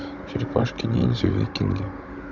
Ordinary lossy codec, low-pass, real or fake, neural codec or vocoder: none; 7.2 kHz; fake; codec, 16 kHz, 16 kbps, FunCodec, trained on Chinese and English, 50 frames a second